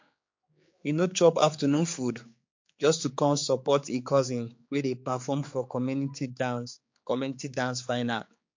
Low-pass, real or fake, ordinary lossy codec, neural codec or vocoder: 7.2 kHz; fake; MP3, 48 kbps; codec, 16 kHz, 4 kbps, X-Codec, HuBERT features, trained on general audio